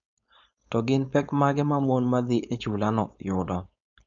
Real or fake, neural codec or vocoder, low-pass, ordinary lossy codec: fake; codec, 16 kHz, 4.8 kbps, FACodec; 7.2 kHz; none